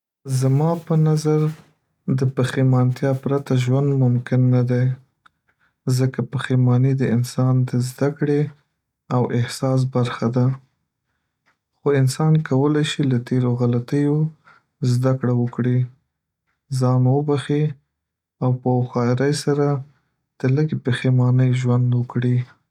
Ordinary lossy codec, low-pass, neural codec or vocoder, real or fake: none; 19.8 kHz; none; real